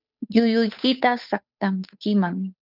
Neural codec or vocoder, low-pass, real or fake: codec, 16 kHz, 8 kbps, FunCodec, trained on Chinese and English, 25 frames a second; 5.4 kHz; fake